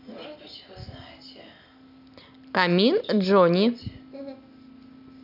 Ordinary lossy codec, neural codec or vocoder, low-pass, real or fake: none; none; 5.4 kHz; real